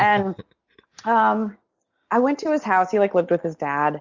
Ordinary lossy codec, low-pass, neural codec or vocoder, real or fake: Opus, 64 kbps; 7.2 kHz; none; real